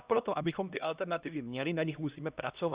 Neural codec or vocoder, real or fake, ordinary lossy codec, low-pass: codec, 16 kHz, 1 kbps, X-Codec, HuBERT features, trained on LibriSpeech; fake; Opus, 64 kbps; 3.6 kHz